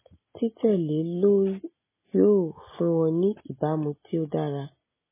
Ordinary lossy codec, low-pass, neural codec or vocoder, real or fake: MP3, 16 kbps; 3.6 kHz; none; real